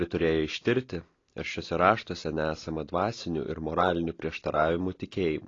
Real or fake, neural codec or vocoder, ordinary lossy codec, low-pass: real; none; AAC, 32 kbps; 7.2 kHz